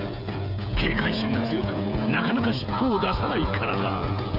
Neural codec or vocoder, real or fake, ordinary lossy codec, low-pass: codec, 16 kHz, 8 kbps, FreqCodec, smaller model; fake; AAC, 48 kbps; 5.4 kHz